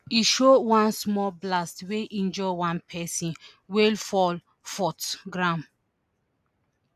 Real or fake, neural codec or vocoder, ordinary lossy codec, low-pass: real; none; none; 14.4 kHz